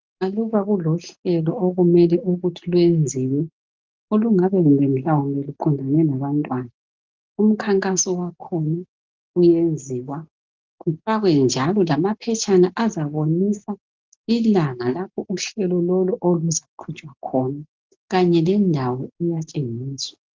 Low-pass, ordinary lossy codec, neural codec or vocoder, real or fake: 7.2 kHz; Opus, 24 kbps; none; real